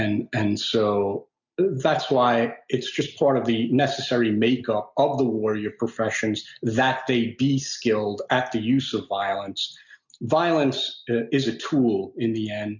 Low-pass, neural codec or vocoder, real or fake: 7.2 kHz; none; real